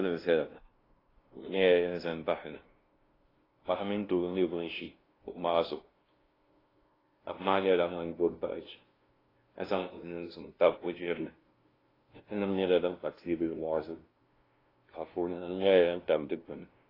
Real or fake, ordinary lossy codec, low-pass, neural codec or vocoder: fake; AAC, 24 kbps; 5.4 kHz; codec, 16 kHz, 0.5 kbps, FunCodec, trained on LibriTTS, 25 frames a second